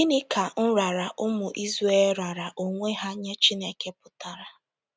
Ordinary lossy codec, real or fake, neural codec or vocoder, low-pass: none; real; none; none